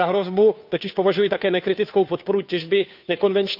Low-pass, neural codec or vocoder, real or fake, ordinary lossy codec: 5.4 kHz; codec, 16 kHz, 2 kbps, FunCodec, trained on Chinese and English, 25 frames a second; fake; none